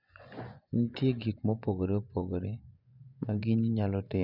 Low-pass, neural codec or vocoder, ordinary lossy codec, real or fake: 5.4 kHz; vocoder, 24 kHz, 100 mel bands, Vocos; none; fake